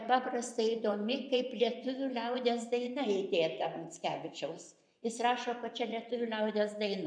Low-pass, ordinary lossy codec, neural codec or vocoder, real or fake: 9.9 kHz; MP3, 96 kbps; vocoder, 24 kHz, 100 mel bands, Vocos; fake